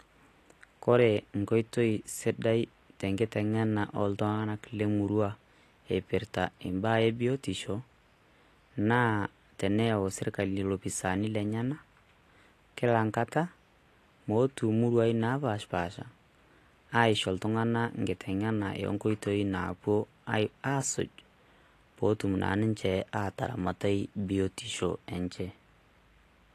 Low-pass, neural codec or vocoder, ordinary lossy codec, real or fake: 14.4 kHz; none; AAC, 48 kbps; real